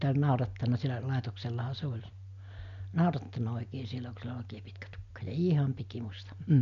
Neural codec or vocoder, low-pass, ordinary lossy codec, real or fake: none; 7.2 kHz; none; real